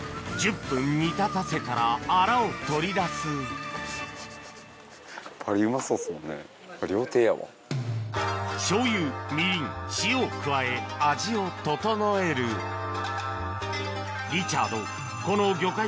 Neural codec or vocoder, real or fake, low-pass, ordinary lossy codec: none; real; none; none